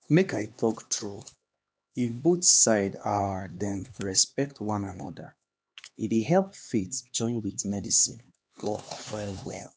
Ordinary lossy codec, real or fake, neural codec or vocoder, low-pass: none; fake; codec, 16 kHz, 2 kbps, X-Codec, HuBERT features, trained on LibriSpeech; none